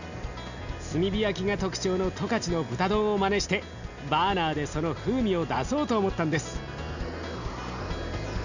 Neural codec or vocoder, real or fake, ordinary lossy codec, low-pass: none; real; none; 7.2 kHz